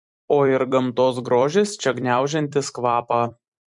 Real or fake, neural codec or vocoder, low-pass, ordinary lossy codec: fake; vocoder, 44.1 kHz, 128 mel bands every 256 samples, BigVGAN v2; 10.8 kHz; MP3, 64 kbps